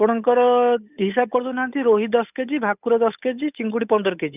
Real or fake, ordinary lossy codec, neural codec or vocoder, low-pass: fake; none; codec, 16 kHz, 8 kbps, FunCodec, trained on Chinese and English, 25 frames a second; 3.6 kHz